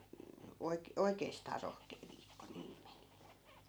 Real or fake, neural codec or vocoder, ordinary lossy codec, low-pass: real; none; none; none